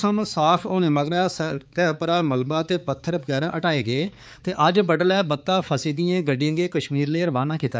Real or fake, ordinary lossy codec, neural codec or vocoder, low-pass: fake; none; codec, 16 kHz, 4 kbps, X-Codec, HuBERT features, trained on balanced general audio; none